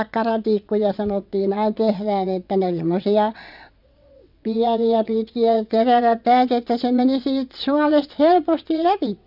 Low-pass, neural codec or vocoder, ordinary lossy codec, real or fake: 5.4 kHz; vocoder, 22.05 kHz, 80 mel bands, WaveNeXt; none; fake